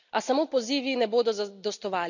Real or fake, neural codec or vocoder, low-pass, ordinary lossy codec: real; none; 7.2 kHz; none